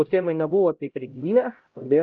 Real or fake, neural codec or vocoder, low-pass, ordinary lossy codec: fake; codec, 16 kHz, 0.5 kbps, X-Codec, HuBERT features, trained on LibriSpeech; 7.2 kHz; Opus, 24 kbps